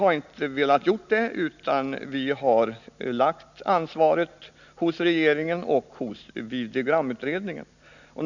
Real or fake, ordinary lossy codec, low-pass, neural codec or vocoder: real; none; 7.2 kHz; none